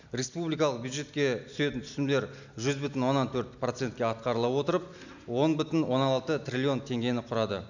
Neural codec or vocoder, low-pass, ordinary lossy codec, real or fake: none; 7.2 kHz; none; real